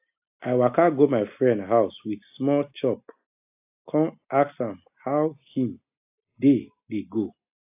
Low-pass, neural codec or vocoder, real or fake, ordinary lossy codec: 3.6 kHz; none; real; AAC, 32 kbps